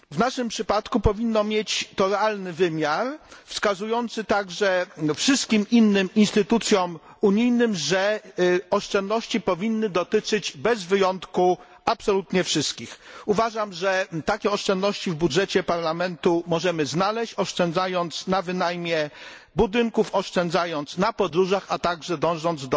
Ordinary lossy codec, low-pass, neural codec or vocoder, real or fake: none; none; none; real